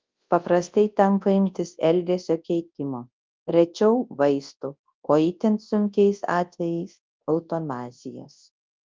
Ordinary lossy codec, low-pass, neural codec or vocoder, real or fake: Opus, 24 kbps; 7.2 kHz; codec, 24 kHz, 0.9 kbps, WavTokenizer, large speech release; fake